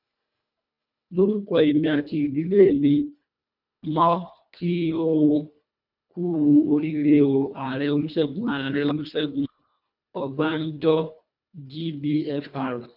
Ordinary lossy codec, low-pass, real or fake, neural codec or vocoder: none; 5.4 kHz; fake; codec, 24 kHz, 1.5 kbps, HILCodec